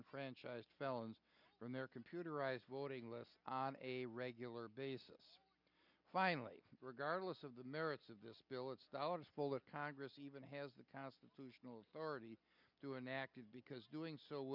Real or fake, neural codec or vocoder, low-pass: real; none; 5.4 kHz